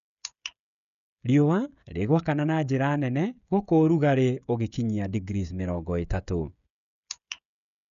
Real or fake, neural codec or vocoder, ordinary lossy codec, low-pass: fake; codec, 16 kHz, 16 kbps, FreqCodec, smaller model; none; 7.2 kHz